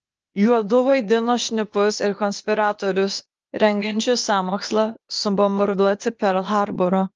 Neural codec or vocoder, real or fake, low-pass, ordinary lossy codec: codec, 16 kHz, 0.8 kbps, ZipCodec; fake; 7.2 kHz; Opus, 24 kbps